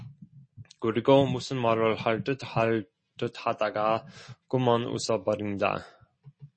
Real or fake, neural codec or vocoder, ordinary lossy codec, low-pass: real; none; MP3, 32 kbps; 9.9 kHz